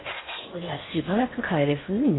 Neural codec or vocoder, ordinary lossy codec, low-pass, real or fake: codec, 16 kHz in and 24 kHz out, 0.6 kbps, FocalCodec, streaming, 4096 codes; AAC, 16 kbps; 7.2 kHz; fake